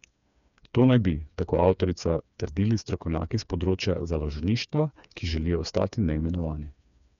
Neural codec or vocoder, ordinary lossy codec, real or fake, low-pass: codec, 16 kHz, 4 kbps, FreqCodec, smaller model; none; fake; 7.2 kHz